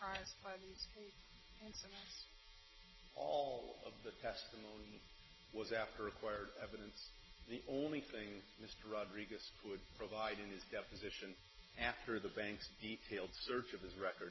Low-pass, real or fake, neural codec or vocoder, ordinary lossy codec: 7.2 kHz; real; none; MP3, 24 kbps